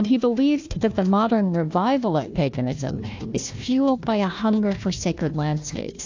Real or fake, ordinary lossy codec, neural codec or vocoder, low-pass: fake; AAC, 48 kbps; codec, 16 kHz, 1 kbps, FunCodec, trained on Chinese and English, 50 frames a second; 7.2 kHz